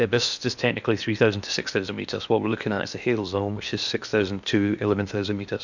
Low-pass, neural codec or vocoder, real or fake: 7.2 kHz; codec, 16 kHz in and 24 kHz out, 0.8 kbps, FocalCodec, streaming, 65536 codes; fake